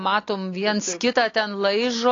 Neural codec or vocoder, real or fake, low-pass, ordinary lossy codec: none; real; 7.2 kHz; AAC, 32 kbps